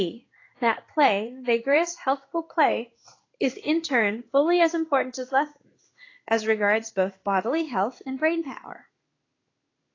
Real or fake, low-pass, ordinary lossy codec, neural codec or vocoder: fake; 7.2 kHz; AAC, 32 kbps; codec, 16 kHz in and 24 kHz out, 1 kbps, XY-Tokenizer